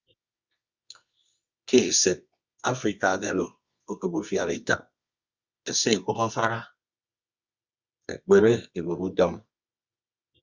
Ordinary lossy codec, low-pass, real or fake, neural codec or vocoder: Opus, 64 kbps; 7.2 kHz; fake; codec, 24 kHz, 0.9 kbps, WavTokenizer, medium music audio release